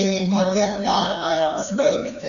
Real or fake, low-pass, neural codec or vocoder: fake; 7.2 kHz; codec, 16 kHz, 1 kbps, FreqCodec, larger model